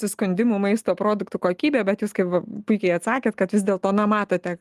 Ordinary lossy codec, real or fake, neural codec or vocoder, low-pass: Opus, 32 kbps; real; none; 14.4 kHz